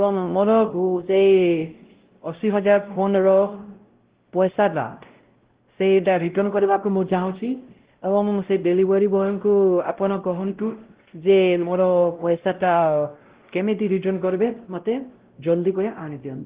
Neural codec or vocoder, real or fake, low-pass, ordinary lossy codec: codec, 16 kHz, 0.5 kbps, X-Codec, WavLM features, trained on Multilingual LibriSpeech; fake; 3.6 kHz; Opus, 16 kbps